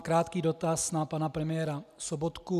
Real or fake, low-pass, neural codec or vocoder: real; 14.4 kHz; none